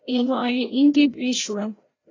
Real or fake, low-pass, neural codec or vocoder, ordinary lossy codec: fake; 7.2 kHz; codec, 16 kHz, 0.5 kbps, FreqCodec, larger model; AAC, 32 kbps